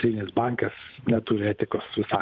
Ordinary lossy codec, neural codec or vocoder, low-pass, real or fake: MP3, 64 kbps; codec, 16 kHz, 8 kbps, FunCodec, trained on Chinese and English, 25 frames a second; 7.2 kHz; fake